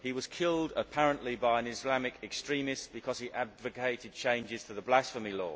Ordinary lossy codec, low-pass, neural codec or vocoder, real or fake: none; none; none; real